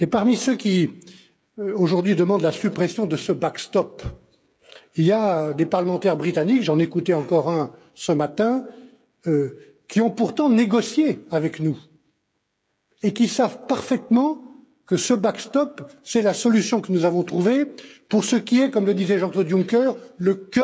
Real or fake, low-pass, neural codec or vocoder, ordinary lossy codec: fake; none; codec, 16 kHz, 16 kbps, FreqCodec, smaller model; none